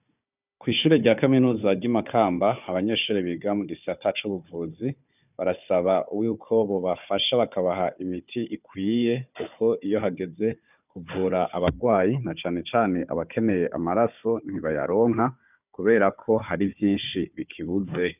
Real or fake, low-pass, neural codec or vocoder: fake; 3.6 kHz; codec, 16 kHz, 4 kbps, FunCodec, trained on Chinese and English, 50 frames a second